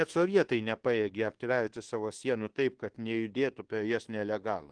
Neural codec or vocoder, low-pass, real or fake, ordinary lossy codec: codec, 24 kHz, 1.2 kbps, DualCodec; 9.9 kHz; fake; Opus, 16 kbps